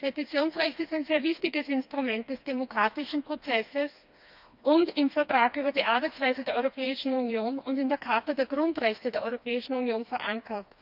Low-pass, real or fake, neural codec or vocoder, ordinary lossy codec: 5.4 kHz; fake; codec, 16 kHz, 2 kbps, FreqCodec, smaller model; none